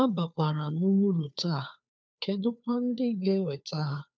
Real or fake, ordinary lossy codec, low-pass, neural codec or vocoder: fake; none; none; codec, 16 kHz, 2 kbps, FunCodec, trained on Chinese and English, 25 frames a second